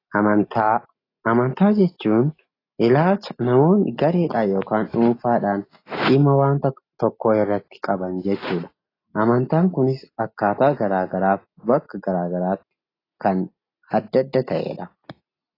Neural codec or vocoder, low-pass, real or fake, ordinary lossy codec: none; 5.4 kHz; real; AAC, 24 kbps